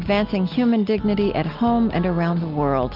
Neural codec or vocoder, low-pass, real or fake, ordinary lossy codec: none; 5.4 kHz; real; Opus, 32 kbps